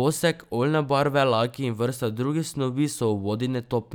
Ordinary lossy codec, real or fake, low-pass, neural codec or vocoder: none; real; none; none